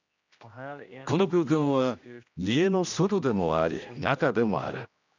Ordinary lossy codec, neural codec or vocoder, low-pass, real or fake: none; codec, 16 kHz, 1 kbps, X-Codec, HuBERT features, trained on general audio; 7.2 kHz; fake